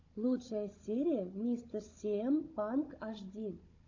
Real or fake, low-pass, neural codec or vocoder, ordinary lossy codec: fake; 7.2 kHz; codec, 16 kHz, 16 kbps, FunCodec, trained on Chinese and English, 50 frames a second; AAC, 48 kbps